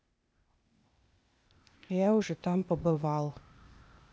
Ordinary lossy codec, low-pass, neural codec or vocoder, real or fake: none; none; codec, 16 kHz, 0.8 kbps, ZipCodec; fake